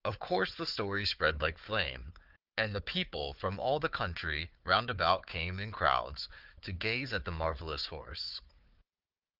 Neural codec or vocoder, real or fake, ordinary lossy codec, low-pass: codec, 16 kHz, 4 kbps, FunCodec, trained on Chinese and English, 50 frames a second; fake; Opus, 24 kbps; 5.4 kHz